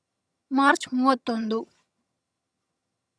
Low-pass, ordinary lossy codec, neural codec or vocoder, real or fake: none; none; vocoder, 22.05 kHz, 80 mel bands, HiFi-GAN; fake